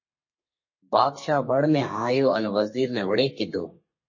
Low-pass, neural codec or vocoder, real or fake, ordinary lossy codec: 7.2 kHz; codec, 32 kHz, 1.9 kbps, SNAC; fake; MP3, 32 kbps